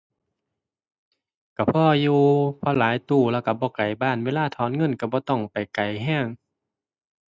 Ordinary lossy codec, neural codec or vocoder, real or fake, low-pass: none; none; real; none